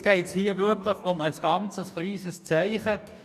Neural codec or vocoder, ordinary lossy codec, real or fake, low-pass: codec, 44.1 kHz, 2.6 kbps, DAC; none; fake; 14.4 kHz